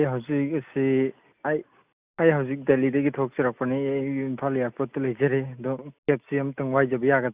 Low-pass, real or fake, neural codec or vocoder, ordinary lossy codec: 3.6 kHz; real; none; Opus, 64 kbps